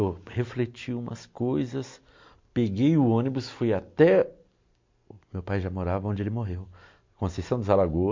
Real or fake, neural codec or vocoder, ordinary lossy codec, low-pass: real; none; MP3, 48 kbps; 7.2 kHz